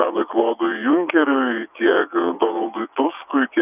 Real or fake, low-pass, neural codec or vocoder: fake; 3.6 kHz; vocoder, 22.05 kHz, 80 mel bands, WaveNeXt